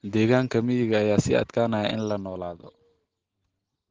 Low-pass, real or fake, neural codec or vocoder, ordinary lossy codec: 7.2 kHz; real; none; Opus, 16 kbps